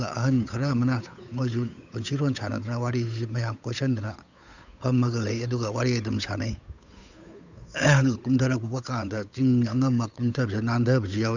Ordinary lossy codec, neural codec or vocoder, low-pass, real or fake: none; codec, 16 kHz, 8 kbps, FunCodec, trained on Chinese and English, 25 frames a second; 7.2 kHz; fake